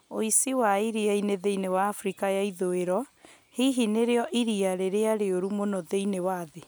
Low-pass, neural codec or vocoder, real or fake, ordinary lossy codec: none; none; real; none